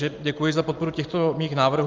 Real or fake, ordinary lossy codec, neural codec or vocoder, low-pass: real; Opus, 32 kbps; none; 7.2 kHz